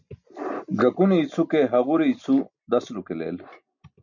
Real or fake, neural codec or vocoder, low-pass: real; none; 7.2 kHz